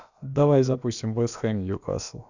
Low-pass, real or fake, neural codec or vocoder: 7.2 kHz; fake; codec, 16 kHz, about 1 kbps, DyCAST, with the encoder's durations